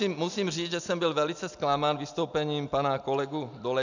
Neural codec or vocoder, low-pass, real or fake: none; 7.2 kHz; real